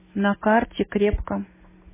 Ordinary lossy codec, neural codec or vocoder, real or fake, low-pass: MP3, 16 kbps; none; real; 3.6 kHz